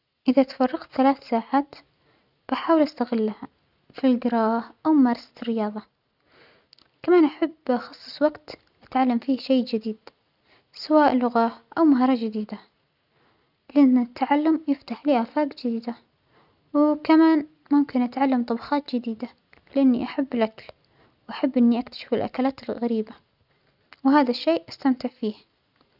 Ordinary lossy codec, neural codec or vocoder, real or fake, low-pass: none; none; real; 5.4 kHz